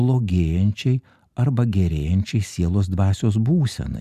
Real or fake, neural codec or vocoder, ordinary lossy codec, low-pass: real; none; Opus, 64 kbps; 14.4 kHz